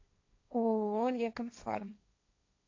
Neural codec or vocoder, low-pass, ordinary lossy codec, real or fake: codec, 16 kHz, 1.1 kbps, Voila-Tokenizer; 7.2 kHz; AAC, 48 kbps; fake